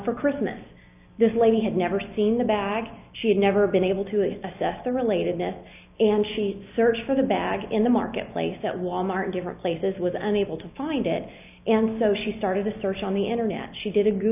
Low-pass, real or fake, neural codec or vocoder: 3.6 kHz; real; none